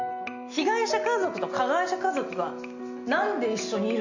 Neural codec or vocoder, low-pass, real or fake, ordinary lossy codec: none; 7.2 kHz; real; none